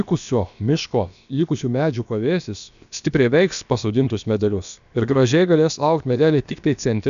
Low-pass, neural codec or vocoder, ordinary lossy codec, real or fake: 7.2 kHz; codec, 16 kHz, about 1 kbps, DyCAST, with the encoder's durations; MP3, 96 kbps; fake